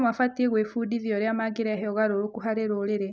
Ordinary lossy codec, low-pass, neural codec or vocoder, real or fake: none; none; none; real